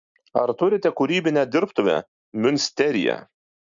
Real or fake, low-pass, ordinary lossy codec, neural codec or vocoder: real; 7.2 kHz; MP3, 64 kbps; none